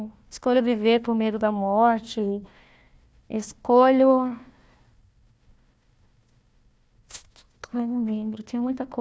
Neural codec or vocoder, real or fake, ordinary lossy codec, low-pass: codec, 16 kHz, 1 kbps, FunCodec, trained on Chinese and English, 50 frames a second; fake; none; none